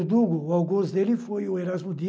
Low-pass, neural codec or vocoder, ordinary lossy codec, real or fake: none; none; none; real